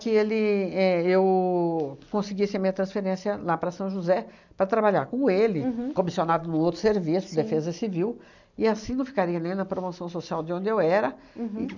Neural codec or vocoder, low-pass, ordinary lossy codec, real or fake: none; 7.2 kHz; none; real